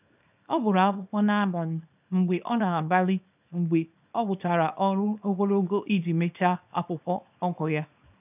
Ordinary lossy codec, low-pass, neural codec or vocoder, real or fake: none; 3.6 kHz; codec, 24 kHz, 0.9 kbps, WavTokenizer, small release; fake